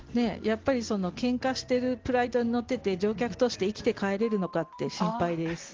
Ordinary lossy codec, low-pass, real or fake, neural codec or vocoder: Opus, 16 kbps; 7.2 kHz; real; none